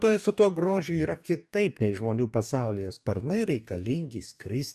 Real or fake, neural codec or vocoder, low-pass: fake; codec, 44.1 kHz, 2.6 kbps, DAC; 14.4 kHz